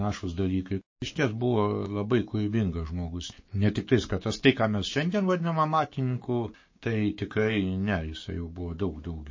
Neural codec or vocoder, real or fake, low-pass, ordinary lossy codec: codec, 16 kHz, 6 kbps, DAC; fake; 7.2 kHz; MP3, 32 kbps